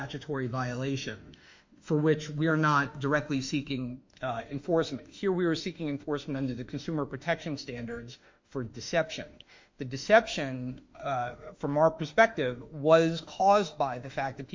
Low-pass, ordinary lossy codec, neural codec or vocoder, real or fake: 7.2 kHz; MP3, 48 kbps; autoencoder, 48 kHz, 32 numbers a frame, DAC-VAE, trained on Japanese speech; fake